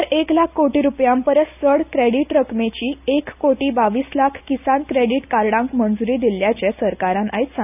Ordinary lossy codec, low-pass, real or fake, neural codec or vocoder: none; 3.6 kHz; real; none